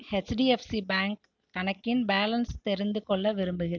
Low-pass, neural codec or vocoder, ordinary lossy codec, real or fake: 7.2 kHz; none; none; real